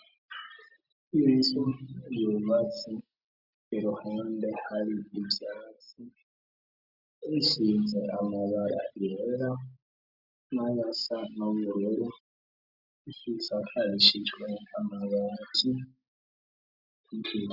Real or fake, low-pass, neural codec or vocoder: real; 5.4 kHz; none